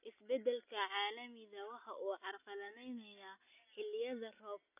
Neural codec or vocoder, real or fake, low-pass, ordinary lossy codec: none; real; 3.6 kHz; none